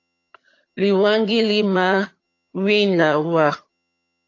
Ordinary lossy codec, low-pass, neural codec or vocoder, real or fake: AAC, 48 kbps; 7.2 kHz; vocoder, 22.05 kHz, 80 mel bands, HiFi-GAN; fake